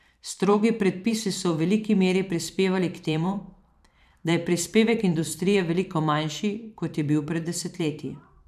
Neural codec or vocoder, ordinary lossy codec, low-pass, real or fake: vocoder, 44.1 kHz, 128 mel bands every 512 samples, BigVGAN v2; none; 14.4 kHz; fake